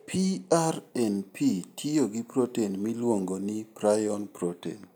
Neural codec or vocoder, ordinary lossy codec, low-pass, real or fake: none; none; none; real